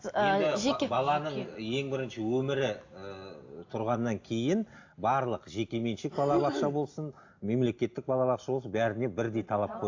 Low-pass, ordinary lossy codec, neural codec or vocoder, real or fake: 7.2 kHz; AAC, 48 kbps; none; real